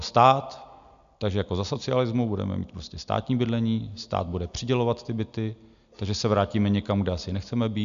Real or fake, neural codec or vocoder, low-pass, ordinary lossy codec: real; none; 7.2 kHz; AAC, 96 kbps